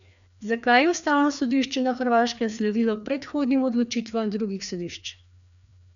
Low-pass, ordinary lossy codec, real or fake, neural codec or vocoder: 7.2 kHz; none; fake; codec, 16 kHz, 2 kbps, FreqCodec, larger model